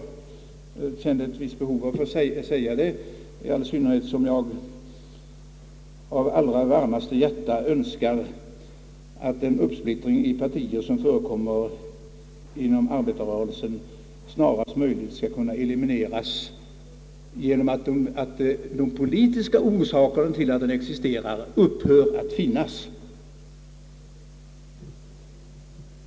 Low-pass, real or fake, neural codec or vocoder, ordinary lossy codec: none; real; none; none